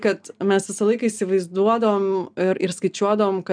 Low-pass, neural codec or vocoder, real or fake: 9.9 kHz; none; real